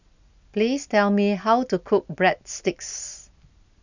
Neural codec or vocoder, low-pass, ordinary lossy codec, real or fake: none; 7.2 kHz; none; real